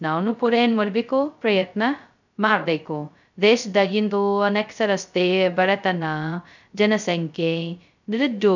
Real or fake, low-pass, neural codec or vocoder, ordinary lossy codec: fake; 7.2 kHz; codec, 16 kHz, 0.2 kbps, FocalCodec; none